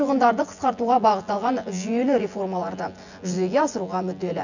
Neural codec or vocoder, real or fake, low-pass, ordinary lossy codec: vocoder, 24 kHz, 100 mel bands, Vocos; fake; 7.2 kHz; none